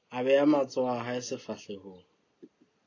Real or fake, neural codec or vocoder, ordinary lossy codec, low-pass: real; none; AAC, 32 kbps; 7.2 kHz